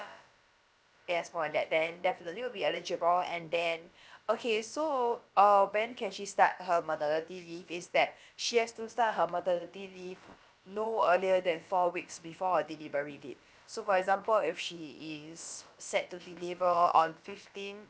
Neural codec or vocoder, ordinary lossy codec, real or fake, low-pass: codec, 16 kHz, about 1 kbps, DyCAST, with the encoder's durations; none; fake; none